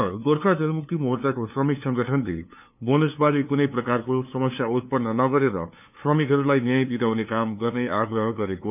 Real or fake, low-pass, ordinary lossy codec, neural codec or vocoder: fake; 3.6 kHz; none; codec, 16 kHz, 2 kbps, FunCodec, trained on LibriTTS, 25 frames a second